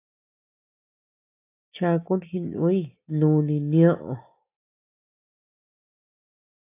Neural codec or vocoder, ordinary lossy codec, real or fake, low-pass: none; AAC, 24 kbps; real; 3.6 kHz